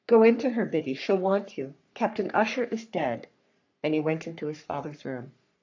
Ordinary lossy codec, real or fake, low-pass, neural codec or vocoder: AAC, 48 kbps; fake; 7.2 kHz; codec, 44.1 kHz, 3.4 kbps, Pupu-Codec